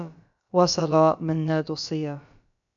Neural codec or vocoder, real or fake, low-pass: codec, 16 kHz, about 1 kbps, DyCAST, with the encoder's durations; fake; 7.2 kHz